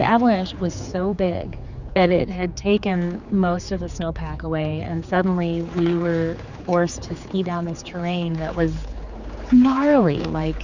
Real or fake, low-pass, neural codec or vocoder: fake; 7.2 kHz; codec, 16 kHz, 4 kbps, X-Codec, HuBERT features, trained on general audio